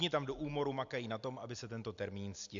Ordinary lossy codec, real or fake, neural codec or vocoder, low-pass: AAC, 64 kbps; real; none; 7.2 kHz